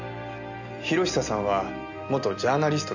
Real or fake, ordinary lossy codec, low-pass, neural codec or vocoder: real; none; 7.2 kHz; none